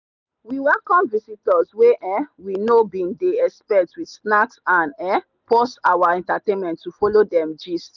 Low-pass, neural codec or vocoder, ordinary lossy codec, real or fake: 7.2 kHz; none; none; real